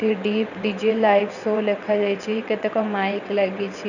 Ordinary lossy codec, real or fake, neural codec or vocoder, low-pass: none; fake; vocoder, 22.05 kHz, 80 mel bands, WaveNeXt; 7.2 kHz